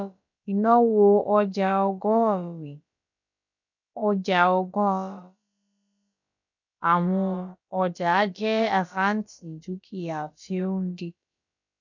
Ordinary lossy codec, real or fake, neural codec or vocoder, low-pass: none; fake; codec, 16 kHz, about 1 kbps, DyCAST, with the encoder's durations; 7.2 kHz